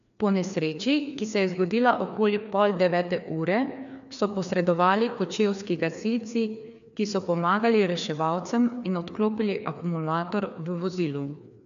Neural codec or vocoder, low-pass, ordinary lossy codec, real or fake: codec, 16 kHz, 2 kbps, FreqCodec, larger model; 7.2 kHz; none; fake